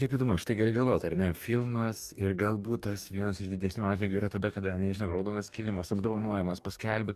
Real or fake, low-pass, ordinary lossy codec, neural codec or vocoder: fake; 14.4 kHz; Opus, 64 kbps; codec, 44.1 kHz, 2.6 kbps, DAC